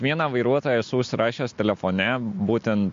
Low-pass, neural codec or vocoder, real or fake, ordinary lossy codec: 7.2 kHz; none; real; MP3, 48 kbps